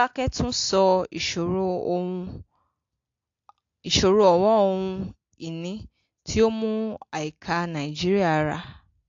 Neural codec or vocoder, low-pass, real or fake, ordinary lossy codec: none; 7.2 kHz; real; AAC, 48 kbps